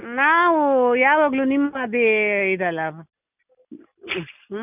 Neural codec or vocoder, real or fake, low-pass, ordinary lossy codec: none; real; 3.6 kHz; none